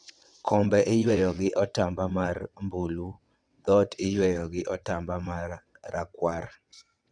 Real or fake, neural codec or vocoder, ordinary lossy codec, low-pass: fake; vocoder, 22.05 kHz, 80 mel bands, WaveNeXt; Opus, 64 kbps; 9.9 kHz